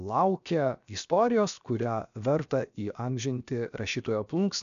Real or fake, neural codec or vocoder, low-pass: fake; codec, 16 kHz, 0.7 kbps, FocalCodec; 7.2 kHz